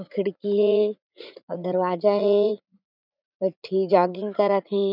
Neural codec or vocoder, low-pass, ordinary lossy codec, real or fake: vocoder, 44.1 kHz, 80 mel bands, Vocos; 5.4 kHz; none; fake